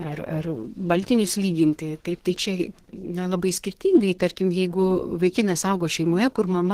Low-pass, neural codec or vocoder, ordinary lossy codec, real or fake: 14.4 kHz; codec, 32 kHz, 1.9 kbps, SNAC; Opus, 16 kbps; fake